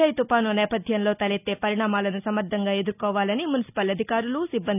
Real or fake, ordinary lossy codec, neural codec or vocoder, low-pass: real; none; none; 3.6 kHz